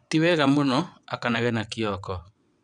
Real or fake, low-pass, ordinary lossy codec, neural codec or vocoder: fake; 9.9 kHz; none; vocoder, 22.05 kHz, 80 mel bands, WaveNeXt